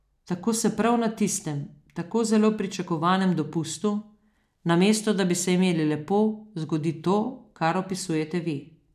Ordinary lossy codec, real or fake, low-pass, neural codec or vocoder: none; real; 14.4 kHz; none